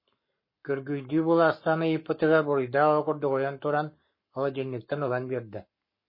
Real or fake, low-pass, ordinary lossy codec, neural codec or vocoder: fake; 5.4 kHz; MP3, 24 kbps; codec, 44.1 kHz, 7.8 kbps, Pupu-Codec